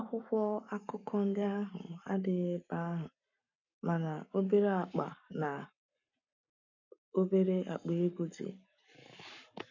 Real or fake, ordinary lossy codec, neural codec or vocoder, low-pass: fake; none; codec, 44.1 kHz, 7.8 kbps, Pupu-Codec; 7.2 kHz